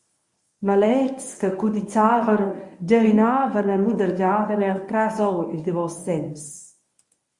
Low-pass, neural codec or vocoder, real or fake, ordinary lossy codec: 10.8 kHz; codec, 24 kHz, 0.9 kbps, WavTokenizer, medium speech release version 1; fake; Opus, 64 kbps